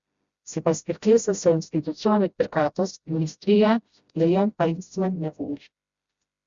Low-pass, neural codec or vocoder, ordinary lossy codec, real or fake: 7.2 kHz; codec, 16 kHz, 0.5 kbps, FreqCodec, smaller model; Opus, 64 kbps; fake